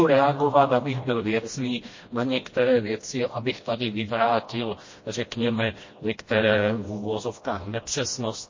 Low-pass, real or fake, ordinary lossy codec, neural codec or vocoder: 7.2 kHz; fake; MP3, 32 kbps; codec, 16 kHz, 1 kbps, FreqCodec, smaller model